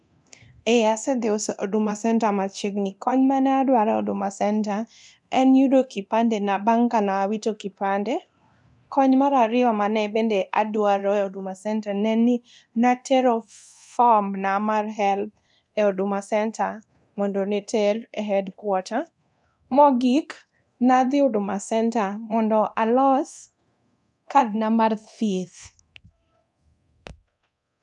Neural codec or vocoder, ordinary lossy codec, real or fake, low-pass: codec, 24 kHz, 0.9 kbps, DualCodec; none; fake; 10.8 kHz